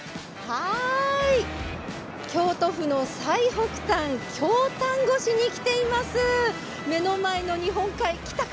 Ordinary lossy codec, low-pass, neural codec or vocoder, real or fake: none; none; none; real